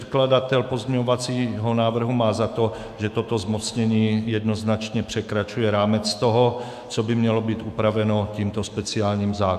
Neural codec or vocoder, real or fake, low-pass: autoencoder, 48 kHz, 128 numbers a frame, DAC-VAE, trained on Japanese speech; fake; 14.4 kHz